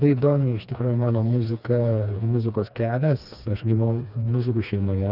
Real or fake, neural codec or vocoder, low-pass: fake; codec, 16 kHz, 2 kbps, FreqCodec, smaller model; 5.4 kHz